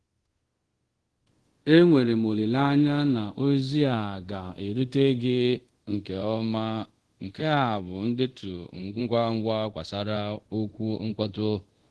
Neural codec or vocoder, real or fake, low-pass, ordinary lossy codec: codec, 24 kHz, 0.5 kbps, DualCodec; fake; 10.8 kHz; Opus, 16 kbps